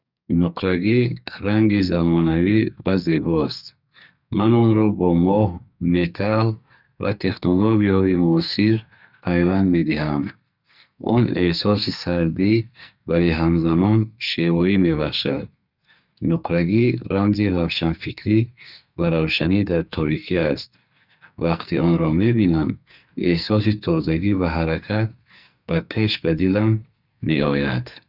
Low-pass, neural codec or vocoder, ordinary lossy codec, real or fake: 5.4 kHz; codec, 44.1 kHz, 2.6 kbps, SNAC; none; fake